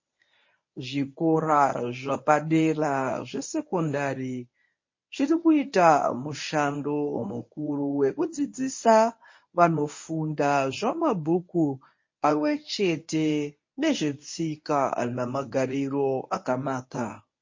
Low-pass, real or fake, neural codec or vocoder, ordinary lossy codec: 7.2 kHz; fake; codec, 24 kHz, 0.9 kbps, WavTokenizer, medium speech release version 1; MP3, 32 kbps